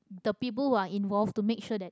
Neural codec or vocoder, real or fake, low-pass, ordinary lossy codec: none; real; none; none